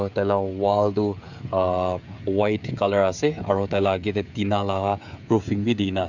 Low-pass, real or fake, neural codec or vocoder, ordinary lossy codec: 7.2 kHz; fake; codec, 16 kHz, 16 kbps, FreqCodec, smaller model; none